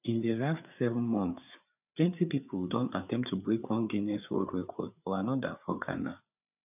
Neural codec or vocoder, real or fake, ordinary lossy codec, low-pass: codec, 16 kHz, 4 kbps, FreqCodec, larger model; fake; none; 3.6 kHz